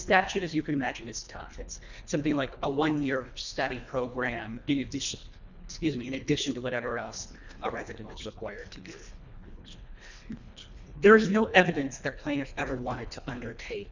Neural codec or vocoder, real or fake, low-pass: codec, 24 kHz, 1.5 kbps, HILCodec; fake; 7.2 kHz